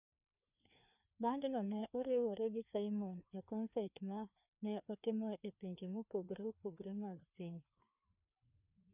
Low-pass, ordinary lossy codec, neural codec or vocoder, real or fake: 3.6 kHz; none; codec, 16 kHz, 2 kbps, FreqCodec, larger model; fake